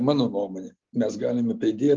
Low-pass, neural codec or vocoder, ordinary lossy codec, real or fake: 7.2 kHz; none; Opus, 32 kbps; real